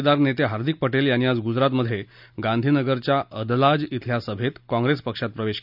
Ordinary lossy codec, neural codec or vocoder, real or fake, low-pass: none; none; real; 5.4 kHz